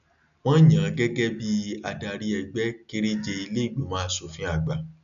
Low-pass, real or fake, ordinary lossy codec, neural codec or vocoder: 7.2 kHz; real; none; none